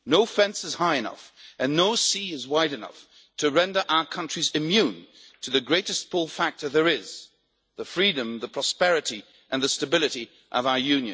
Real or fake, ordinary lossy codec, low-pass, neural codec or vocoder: real; none; none; none